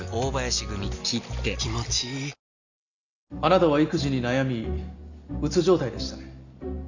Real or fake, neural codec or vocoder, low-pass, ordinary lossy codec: real; none; 7.2 kHz; none